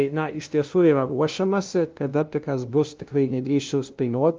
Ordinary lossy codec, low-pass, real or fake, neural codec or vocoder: Opus, 24 kbps; 7.2 kHz; fake; codec, 16 kHz, 0.5 kbps, FunCodec, trained on LibriTTS, 25 frames a second